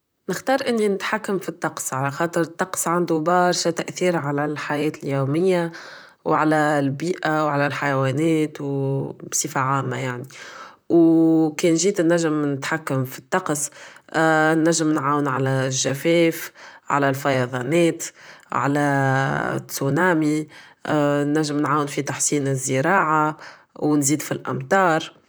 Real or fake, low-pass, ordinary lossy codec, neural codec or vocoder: fake; none; none; vocoder, 44.1 kHz, 128 mel bands, Pupu-Vocoder